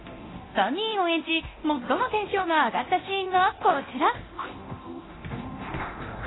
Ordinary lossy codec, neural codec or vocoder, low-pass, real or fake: AAC, 16 kbps; codec, 24 kHz, 0.9 kbps, DualCodec; 7.2 kHz; fake